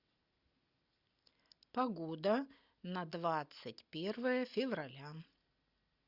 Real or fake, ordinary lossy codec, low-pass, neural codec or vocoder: real; Opus, 64 kbps; 5.4 kHz; none